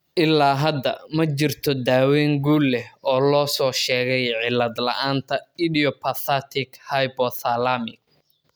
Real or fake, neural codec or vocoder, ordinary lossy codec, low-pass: real; none; none; none